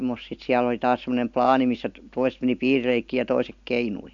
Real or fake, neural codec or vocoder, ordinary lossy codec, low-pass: real; none; AAC, 64 kbps; 7.2 kHz